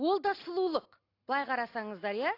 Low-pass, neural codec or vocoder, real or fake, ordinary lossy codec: 5.4 kHz; none; real; AAC, 32 kbps